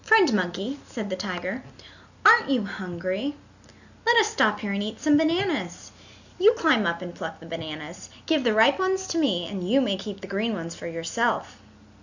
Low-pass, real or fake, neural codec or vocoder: 7.2 kHz; real; none